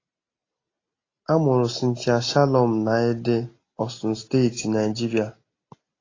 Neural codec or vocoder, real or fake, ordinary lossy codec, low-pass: none; real; AAC, 32 kbps; 7.2 kHz